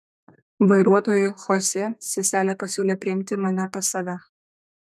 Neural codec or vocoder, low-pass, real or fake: codec, 32 kHz, 1.9 kbps, SNAC; 14.4 kHz; fake